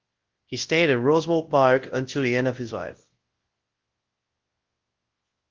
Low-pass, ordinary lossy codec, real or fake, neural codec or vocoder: 7.2 kHz; Opus, 16 kbps; fake; codec, 24 kHz, 0.9 kbps, WavTokenizer, large speech release